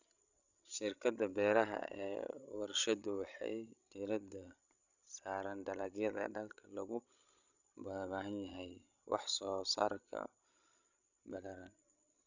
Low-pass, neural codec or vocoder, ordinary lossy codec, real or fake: 7.2 kHz; codec, 16 kHz, 16 kbps, FreqCodec, larger model; none; fake